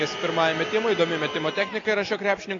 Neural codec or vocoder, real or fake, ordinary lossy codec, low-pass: none; real; AAC, 32 kbps; 7.2 kHz